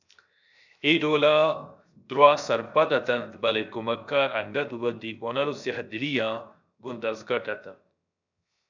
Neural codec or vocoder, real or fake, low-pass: codec, 16 kHz, 0.7 kbps, FocalCodec; fake; 7.2 kHz